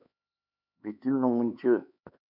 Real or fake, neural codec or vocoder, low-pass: fake; codec, 16 kHz, 4 kbps, X-Codec, HuBERT features, trained on LibriSpeech; 5.4 kHz